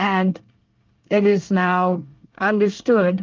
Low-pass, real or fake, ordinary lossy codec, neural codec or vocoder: 7.2 kHz; fake; Opus, 32 kbps; codec, 24 kHz, 1 kbps, SNAC